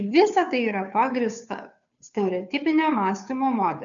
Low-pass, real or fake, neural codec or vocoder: 7.2 kHz; fake; codec, 16 kHz, 2 kbps, FunCodec, trained on Chinese and English, 25 frames a second